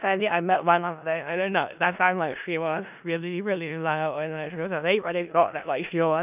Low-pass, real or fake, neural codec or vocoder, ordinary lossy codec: 3.6 kHz; fake; codec, 16 kHz in and 24 kHz out, 0.4 kbps, LongCat-Audio-Codec, four codebook decoder; none